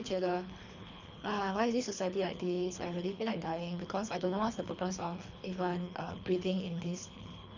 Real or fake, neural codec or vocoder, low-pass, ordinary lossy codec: fake; codec, 24 kHz, 3 kbps, HILCodec; 7.2 kHz; none